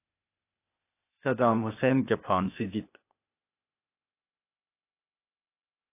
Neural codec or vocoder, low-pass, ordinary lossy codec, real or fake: codec, 16 kHz, 0.8 kbps, ZipCodec; 3.6 kHz; AAC, 24 kbps; fake